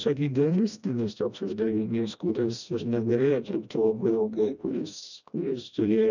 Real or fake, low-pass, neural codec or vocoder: fake; 7.2 kHz; codec, 16 kHz, 1 kbps, FreqCodec, smaller model